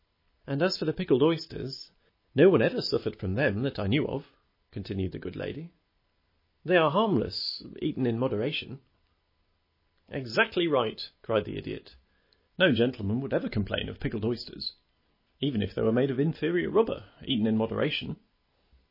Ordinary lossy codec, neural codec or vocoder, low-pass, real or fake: MP3, 24 kbps; none; 5.4 kHz; real